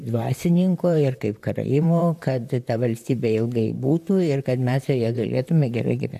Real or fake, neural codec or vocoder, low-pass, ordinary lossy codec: fake; vocoder, 44.1 kHz, 128 mel bands, Pupu-Vocoder; 14.4 kHz; AAC, 96 kbps